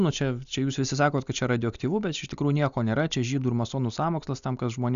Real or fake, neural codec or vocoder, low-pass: real; none; 7.2 kHz